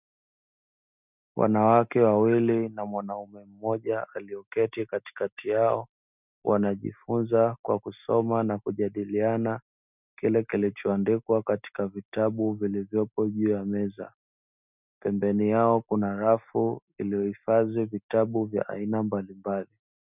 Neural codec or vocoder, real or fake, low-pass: none; real; 3.6 kHz